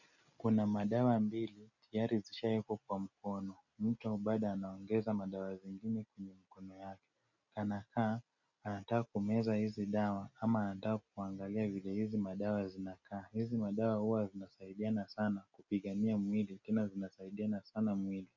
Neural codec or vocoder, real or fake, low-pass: none; real; 7.2 kHz